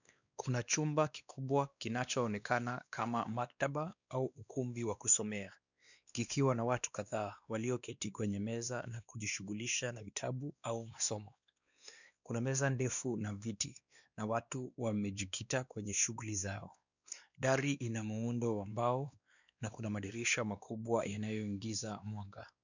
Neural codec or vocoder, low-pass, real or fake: codec, 16 kHz, 2 kbps, X-Codec, WavLM features, trained on Multilingual LibriSpeech; 7.2 kHz; fake